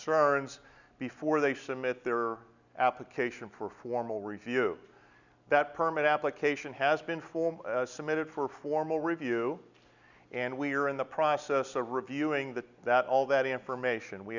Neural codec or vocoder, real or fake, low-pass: none; real; 7.2 kHz